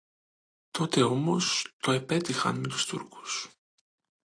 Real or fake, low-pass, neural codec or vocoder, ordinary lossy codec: real; 9.9 kHz; none; AAC, 48 kbps